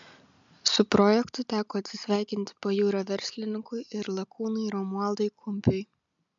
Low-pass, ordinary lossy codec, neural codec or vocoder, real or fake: 7.2 kHz; MP3, 64 kbps; none; real